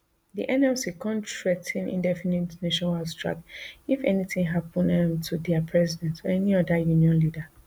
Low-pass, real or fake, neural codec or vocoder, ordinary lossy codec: none; real; none; none